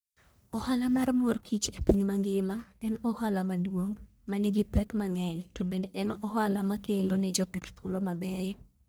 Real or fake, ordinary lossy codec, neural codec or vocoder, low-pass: fake; none; codec, 44.1 kHz, 1.7 kbps, Pupu-Codec; none